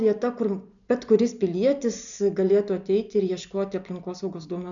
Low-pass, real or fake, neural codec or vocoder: 7.2 kHz; real; none